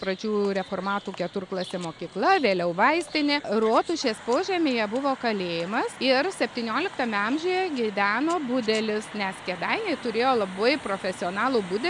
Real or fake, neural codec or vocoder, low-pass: real; none; 10.8 kHz